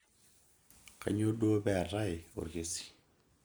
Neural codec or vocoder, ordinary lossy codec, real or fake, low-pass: none; none; real; none